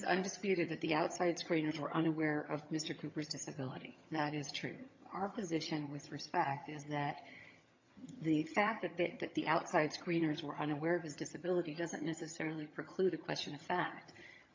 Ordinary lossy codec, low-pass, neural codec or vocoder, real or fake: MP3, 48 kbps; 7.2 kHz; vocoder, 22.05 kHz, 80 mel bands, HiFi-GAN; fake